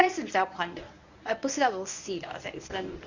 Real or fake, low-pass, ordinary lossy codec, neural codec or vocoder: fake; 7.2 kHz; none; codec, 24 kHz, 0.9 kbps, WavTokenizer, medium speech release version 1